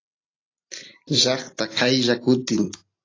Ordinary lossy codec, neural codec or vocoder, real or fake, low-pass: AAC, 32 kbps; none; real; 7.2 kHz